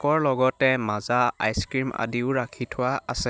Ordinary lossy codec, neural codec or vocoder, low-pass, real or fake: none; none; none; real